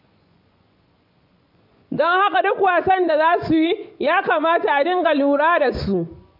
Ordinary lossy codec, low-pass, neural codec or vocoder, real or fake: MP3, 48 kbps; 5.4 kHz; vocoder, 24 kHz, 100 mel bands, Vocos; fake